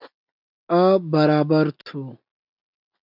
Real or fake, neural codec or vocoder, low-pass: real; none; 5.4 kHz